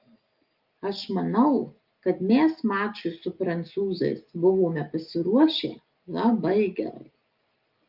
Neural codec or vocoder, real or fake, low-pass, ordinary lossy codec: none; real; 5.4 kHz; Opus, 32 kbps